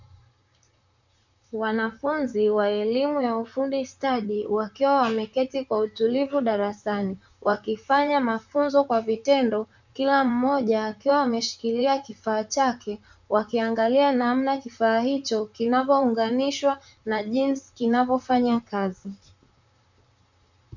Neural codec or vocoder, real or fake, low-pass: vocoder, 44.1 kHz, 128 mel bands, Pupu-Vocoder; fake; 7.2 kHz